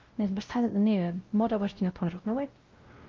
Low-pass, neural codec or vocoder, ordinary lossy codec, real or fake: 7.2 kHz; codec, 16 kHz, 0.5 kbps, X-Codec, WavLM features, trained on Multilingual LibriSpeech; Opus, 32 kbps; fake